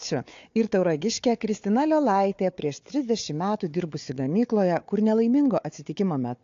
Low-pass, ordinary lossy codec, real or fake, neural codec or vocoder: 7.2 kHz; AAC, 48 kbps; fake; codec, 16 kHz, 4 kbps, FunCodec, trained on Chinese and English, 50 frames a second